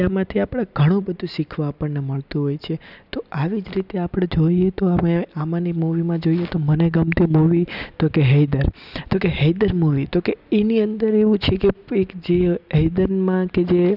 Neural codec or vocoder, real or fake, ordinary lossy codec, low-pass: none; real; none; 5.4 kHz